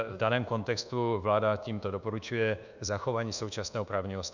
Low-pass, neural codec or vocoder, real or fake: 7.2 kHz; codec, 24 kHz, 1.2 kbps, DualCodec; fake